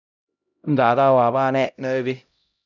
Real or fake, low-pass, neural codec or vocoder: fake; 7.2 kHz; codec, 16 kHz, 0.5 kbps, X-Codec, HuBERT features, trained on LibriSpeech